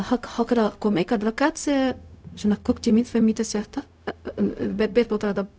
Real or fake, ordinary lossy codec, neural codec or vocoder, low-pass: fake; none; codec, 16 kHz, 0.4 kbps, LongCat-Audio-Codec; none